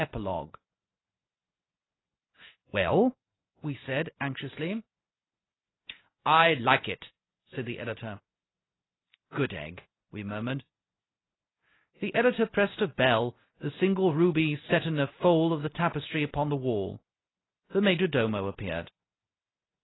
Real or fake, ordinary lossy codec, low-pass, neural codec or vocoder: real; AAC, 16 kbps; 7.2 kHz; none